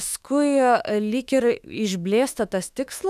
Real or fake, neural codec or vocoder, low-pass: fake; autoencoder, 48 kHz, 32 numbers a frame, DAC-VAE, trained on Japanese speech; 14.4 kHz